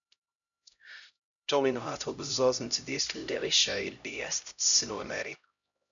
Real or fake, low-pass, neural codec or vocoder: fake; 7.2 kHz; codec, 16 kHz, 0.5 kbps, X-Codec, HuBERT features, trained on LibriSpeech